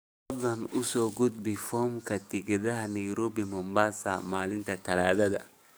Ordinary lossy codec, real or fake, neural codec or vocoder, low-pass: none; fake; codec, 44.1 kHz, 7.8 kbps, DAC; none